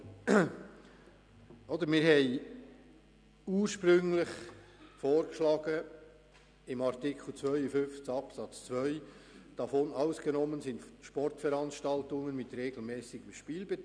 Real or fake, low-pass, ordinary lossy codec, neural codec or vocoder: real; 9.9 kHz; none; none